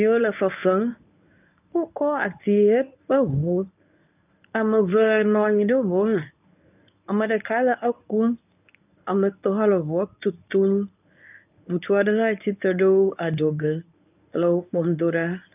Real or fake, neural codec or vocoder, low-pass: fake; codec, 24 kHz, 0.9 kbps, WavTokenizer, medium speech release version 1; 3.6 kHz